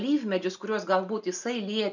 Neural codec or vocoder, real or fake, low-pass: none; real; 7.2 kHz